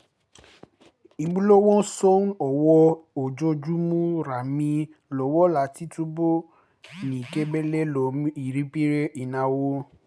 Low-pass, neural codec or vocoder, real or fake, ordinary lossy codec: none; none; real; none